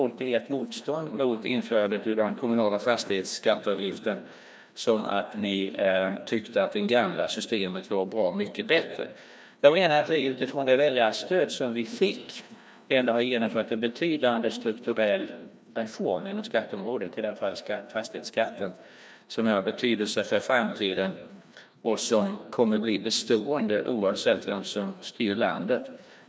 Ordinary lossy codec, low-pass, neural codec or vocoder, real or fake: none; none; codec, 16 kHz, 1 kbps, FreqCodec, larger model; fake